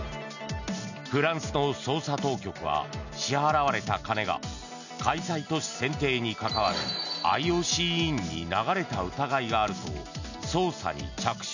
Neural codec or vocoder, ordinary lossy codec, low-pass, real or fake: none; none; 7.2 kHz; real